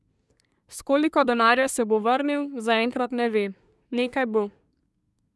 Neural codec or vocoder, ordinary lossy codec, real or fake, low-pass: codec, 24 kHz, 1 kbps, SNAC; none; fake; none